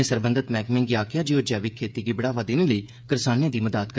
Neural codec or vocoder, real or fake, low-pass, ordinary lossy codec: codec, 16 kHz, 8 kbps, FreqCodec, smaller model; fake; none; none